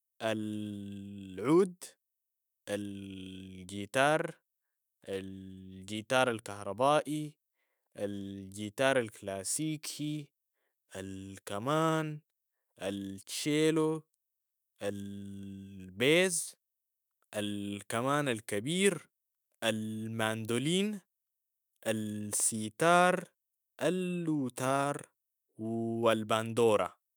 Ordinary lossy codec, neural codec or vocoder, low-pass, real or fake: none; autoencoder, 48 kHz, 128 numbers a frame, DAC-VAE, trained on Japanese speech; none; fake